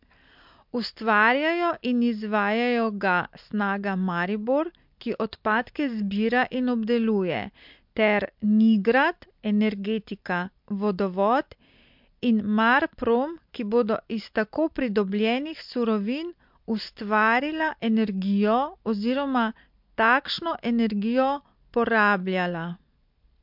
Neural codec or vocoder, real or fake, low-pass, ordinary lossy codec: none; real; 5.4 kHz; MP3, 48 kbps